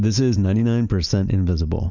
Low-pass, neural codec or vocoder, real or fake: 7.2 kHz; none; real